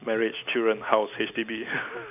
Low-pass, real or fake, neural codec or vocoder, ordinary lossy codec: 3.6 kHz; real; none; none